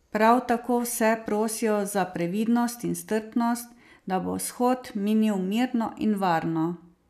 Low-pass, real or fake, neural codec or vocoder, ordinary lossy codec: 14.4 kHz; real; none; none